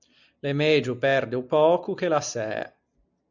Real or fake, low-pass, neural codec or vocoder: real; 7.2 kHz; none